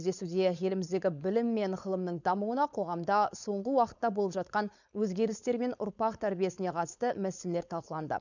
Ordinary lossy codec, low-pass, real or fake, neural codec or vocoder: none; 7.2 kHz; fake; codec, 16 kHz, 4.8 kbps, FACodec